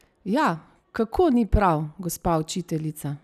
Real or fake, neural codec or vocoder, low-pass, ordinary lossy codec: real; none; 14.4 kHz; none